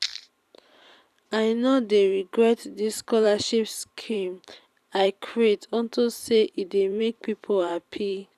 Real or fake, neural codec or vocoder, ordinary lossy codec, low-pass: fake; vocoder, 44.1 kHz, 128 mel bands every 512 samples, BigVGAN v2; none; 14.4 kHz